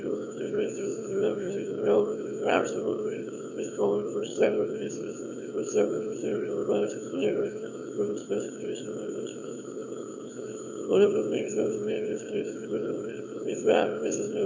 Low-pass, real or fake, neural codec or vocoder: 7.2 kHz; fake; autoencoder, 22.05 kHz, a latent of 192 numbers a frame, VITS, trained on one speaker